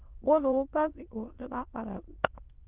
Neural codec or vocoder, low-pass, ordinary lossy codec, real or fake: autoencoder, 22.05 kHz, a latent of 192 numbers a frame, VITS, trained on many speakers; 3.6 kHz; Opus, 16 kbps; fake